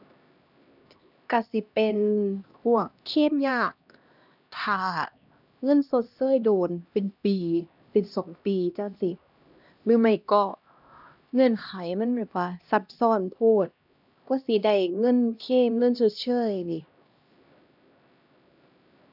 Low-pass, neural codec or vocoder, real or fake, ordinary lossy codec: 5.4 kHz; codec, 16 kHz, 1 kbps, X-Codec, HuBERT features, trained on LibriSpeech; fake; none